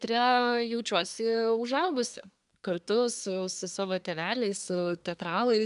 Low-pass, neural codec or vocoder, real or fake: 10.8 kHz; codec, 24 kHz, 1 kbps, SNAC; fake